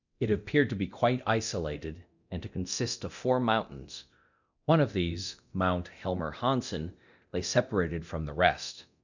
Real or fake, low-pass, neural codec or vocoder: fake; 7.2 kHz; codec, 24 kHz, 0.9 kbps, DualCodec